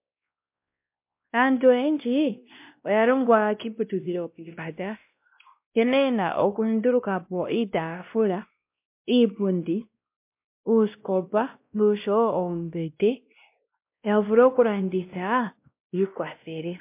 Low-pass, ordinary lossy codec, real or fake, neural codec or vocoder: 3.6 kHz; MP3, 32 kbps; fake; codec, 16 kHz, 1 kbps, X-Codec, WavLM features, trained on Multilingual LibriSpeech